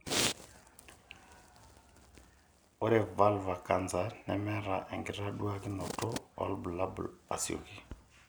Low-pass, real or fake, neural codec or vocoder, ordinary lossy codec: none; real; none; none